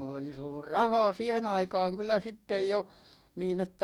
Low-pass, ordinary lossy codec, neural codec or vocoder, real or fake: 19.8 kHz; none; codec, 44.1 kHz, 2.6 kbps, DAC; fake